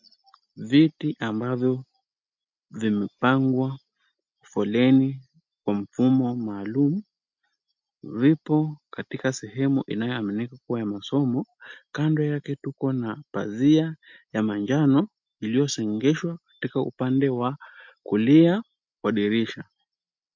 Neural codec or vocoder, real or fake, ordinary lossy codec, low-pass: none; real; MP3, 48 kbps; 7.2 kHz